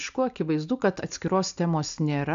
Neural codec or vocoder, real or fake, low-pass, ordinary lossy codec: none; real; 7.2 kHz; AAC, 64 kbps